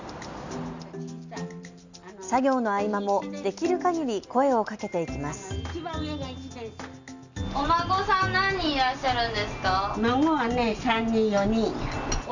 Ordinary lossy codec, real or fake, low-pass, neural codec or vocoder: none; real; 7.2 kHz; none